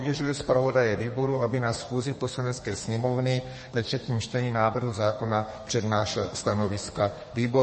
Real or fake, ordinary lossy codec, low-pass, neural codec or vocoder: fake; MP3, 32 kbps; 10.8 kHz; codec, 32 kHz, 1.9 kbps, SNAC